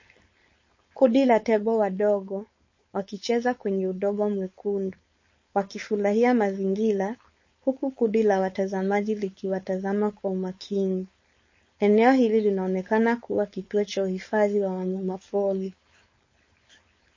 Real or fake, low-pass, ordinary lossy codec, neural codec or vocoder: fake; 7.2 kHz; MP3, 32 kbps; codec, 16 kHz, 4.8 kbps, FACodec